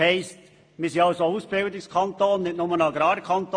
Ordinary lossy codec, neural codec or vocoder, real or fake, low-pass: AAC, 48 kbps; none; real; 9.9 kHz